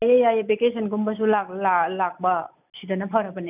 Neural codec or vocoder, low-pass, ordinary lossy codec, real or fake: none; 3.6 kHz; none; real